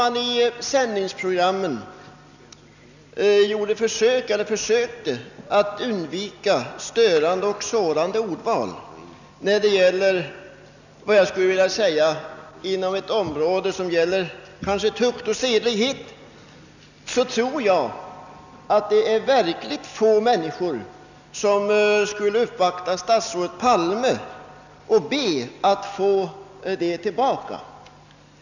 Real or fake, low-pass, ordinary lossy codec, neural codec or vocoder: real; 7.2 kHz; none; none